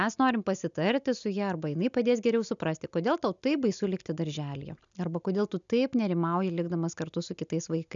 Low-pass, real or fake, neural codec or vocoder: 7.2 kHz; real; none